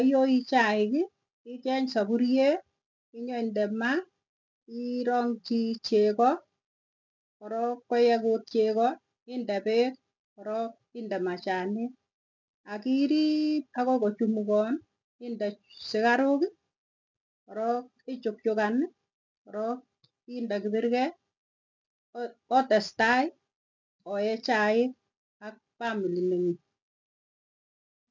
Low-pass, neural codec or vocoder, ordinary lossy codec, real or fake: 7.2 kHz; none; MP3, 64 kbps; real